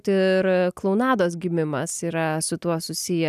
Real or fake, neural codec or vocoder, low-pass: real; none; 14.4 kHz